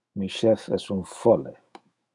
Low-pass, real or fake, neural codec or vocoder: 10.8 kHz; fake; autoencoder, 48 kHz, 128 numbers a frame, DAC-VAE, trained on Japanese speech